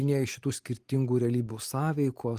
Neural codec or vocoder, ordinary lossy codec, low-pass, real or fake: none; Opus, 24 kbps; 14.4 kHz; real